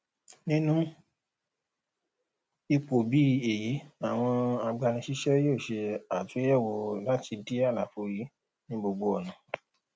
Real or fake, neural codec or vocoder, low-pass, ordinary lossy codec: real; none; none; none